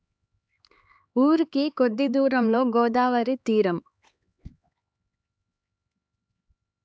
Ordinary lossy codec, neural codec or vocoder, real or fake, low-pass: none; codec, 16 kHz, 4 kbps, X-Codec, HuBERT features, trained on LibriSpeech; fake; none